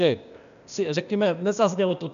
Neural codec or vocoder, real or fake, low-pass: codec, 16 kHz, 0.8 kbps, ZipCodec; fake; 7.2 kHz